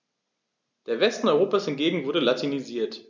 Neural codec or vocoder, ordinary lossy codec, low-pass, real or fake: none; none; none; real